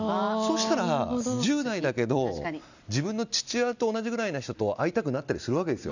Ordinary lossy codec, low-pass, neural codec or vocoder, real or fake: none; 7.2 kHz; none; real